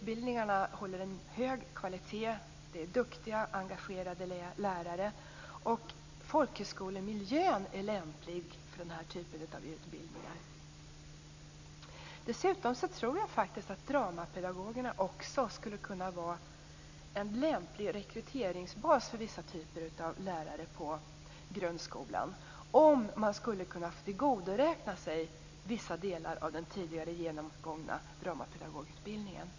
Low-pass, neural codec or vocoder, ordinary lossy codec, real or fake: 7.2 kHz; none; AAC, 48 kbps; real